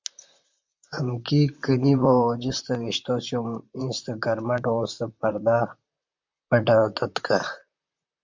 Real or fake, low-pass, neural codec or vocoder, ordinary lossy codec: fake; 7.2 kHz; vocoder, 44.1 kHz, 128 mel bands, Pupu-Vocoder; MP3, 64 kbps